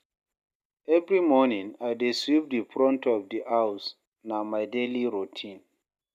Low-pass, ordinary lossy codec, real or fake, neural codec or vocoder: 14.4 kHz; none; real; none